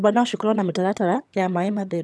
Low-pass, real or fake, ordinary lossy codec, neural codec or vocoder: none; fake; none; vocoder, 22.05 kHz, 80 mel bands, HiFi-GAN